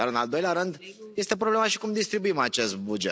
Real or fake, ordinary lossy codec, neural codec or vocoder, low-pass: real; none; none; none